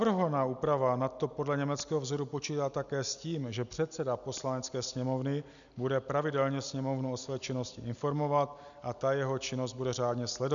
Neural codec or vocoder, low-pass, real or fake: none; 7.2 kHz; real